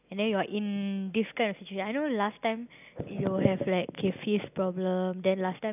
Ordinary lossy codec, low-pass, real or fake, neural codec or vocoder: none; 3.6 kHz; real; none